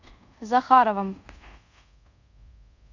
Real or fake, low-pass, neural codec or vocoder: fake; 7.2 kHz; codec, 24 kHz, 0.5 kbps, DualCodec